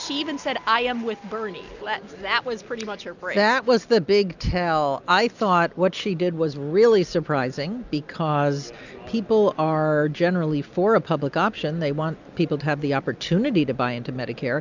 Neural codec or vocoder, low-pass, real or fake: none; 7.2 kHz; real